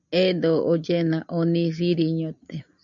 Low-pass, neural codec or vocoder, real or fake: 7.2 kHz; none; real